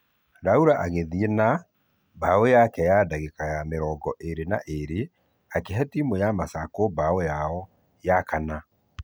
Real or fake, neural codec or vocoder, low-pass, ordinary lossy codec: real; none; none; none